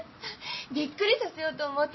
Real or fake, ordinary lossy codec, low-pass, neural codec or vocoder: real; MP3, 24 kbps; 7.2 kHz; none